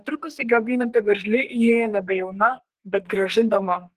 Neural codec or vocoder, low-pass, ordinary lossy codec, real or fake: codec, 32 kHz, 1.9 kbps, SNAC; 14.4 kHz; Opus, 16 kbps; fake